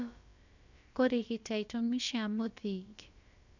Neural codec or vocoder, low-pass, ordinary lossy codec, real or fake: codec, 16 kHz, about 1 kbps, DyCAST, with the encoder's durations; 7.2 kHz; none; fake